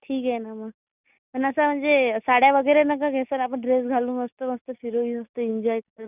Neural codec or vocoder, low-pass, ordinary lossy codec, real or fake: none; 3.6 kHz; none; real